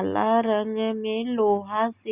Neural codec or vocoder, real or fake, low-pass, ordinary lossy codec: none; real; 3.6 kHz; Opus, 64 kbps